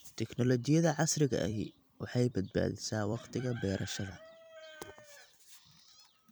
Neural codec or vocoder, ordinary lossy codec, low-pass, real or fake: vocoder, 44.1 kHz, 128 mel bands every 256 samples, BigVGAN v2; none; none; fake